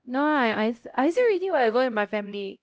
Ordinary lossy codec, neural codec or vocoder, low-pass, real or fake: none; codec, 16 kHz, 0.5 kbps, X-Codec, HuBERT features, trained on LibriSpeech; none; fake